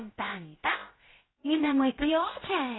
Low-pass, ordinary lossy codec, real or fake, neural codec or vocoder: 7.2 kHz; AAC, 16 kbps; fake; codec, 16 kHz, about 1 kbps, DyCAST, with the encoder's durations